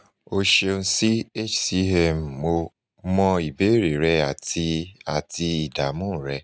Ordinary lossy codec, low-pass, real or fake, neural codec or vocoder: none; none; real; none